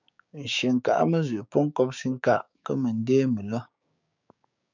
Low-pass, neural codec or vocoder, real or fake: 7.2 kHz; codec, 16 kHz, 6 kbps, DAC; fake